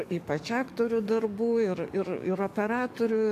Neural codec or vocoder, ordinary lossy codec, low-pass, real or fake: codec, 44.1 kHz, 7.8 kbps, DAC; AAC, 64 kbps; 14.4 kHz; fake